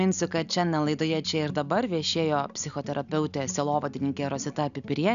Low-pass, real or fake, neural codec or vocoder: 7.2 kHz; real; none